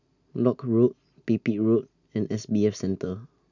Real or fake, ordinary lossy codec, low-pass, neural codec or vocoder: real; none; 7.2 kHz; none